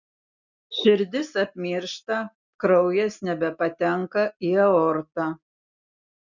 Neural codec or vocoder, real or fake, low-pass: none; real; 7.2 kHz